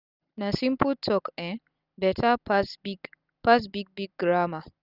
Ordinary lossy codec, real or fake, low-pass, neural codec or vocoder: none; real; 5.4 kHz; none